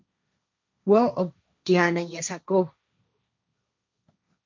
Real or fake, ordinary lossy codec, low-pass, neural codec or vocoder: fake; MP3, 64 kbps; 7.2 kHz; codec, 16 kHz, 1.1 kbps, Voila-Tokenizer